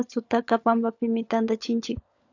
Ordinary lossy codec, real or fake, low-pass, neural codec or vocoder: AAC, 48 kbps; fake; 7.2 kHz; codec, 16 kHz, 16 kbps, FunCodec, trained on LibriTTS, 50 frames a second